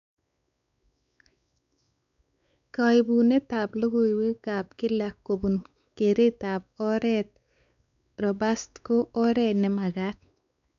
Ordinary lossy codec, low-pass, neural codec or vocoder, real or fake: none; 7.2 kHz; codec, 16 kHz, 4 kbps, X-Codec, WavLM features, trained on Multilingual LibriSpeech; fake